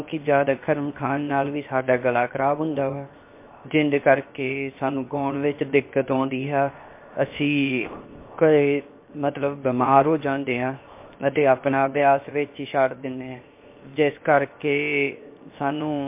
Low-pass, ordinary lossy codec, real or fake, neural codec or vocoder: 3.6 kHz; MP3, 24 kbps; fake; codec, 16 kHz, 0.7 kbps, FocalCodec